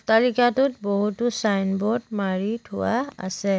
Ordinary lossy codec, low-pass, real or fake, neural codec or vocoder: none; none; real; none